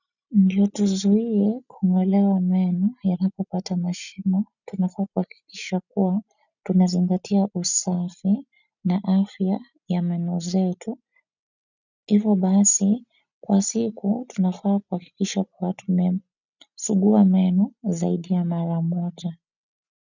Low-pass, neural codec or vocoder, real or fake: 7.2 kHz; none; real